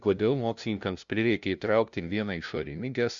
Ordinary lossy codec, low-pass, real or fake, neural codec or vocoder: Opus, 64 kbps; 7.2 kHz; fake; codec, 16 kHz, 0.5 kbps, FunCodec, trained on LibriTTS, 25 frames a second